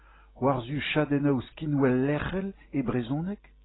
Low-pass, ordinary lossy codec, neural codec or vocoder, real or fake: 7.2 kHz; AAC, 16 kbps; none; real